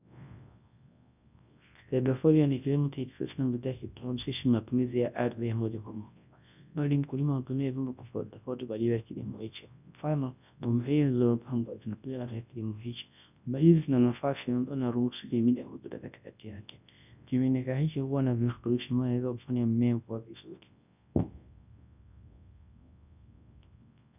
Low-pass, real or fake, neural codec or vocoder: 3.6 kHz; fake; codec, 24 kHz, 0.9 kbps, WavTokenizer, large speech release